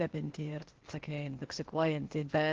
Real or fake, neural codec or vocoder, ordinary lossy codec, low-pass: fake; codec, 16 kHz, 0.8 kbps, ZipCodec; Opus, 16 kbps; 7.2 kHz